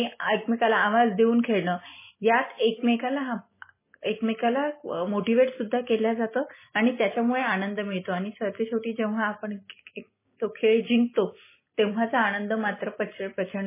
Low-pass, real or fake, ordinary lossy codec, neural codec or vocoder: 3.6 kHz; real; MP3, 16 kbps; none